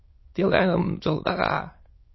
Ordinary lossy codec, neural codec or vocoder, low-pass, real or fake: MP3, 24 kbps; autoencoder, 22.05 kHz, a latent of 192 numbers a frame, VITS, trained on many speakers; 7.2 kHz; fake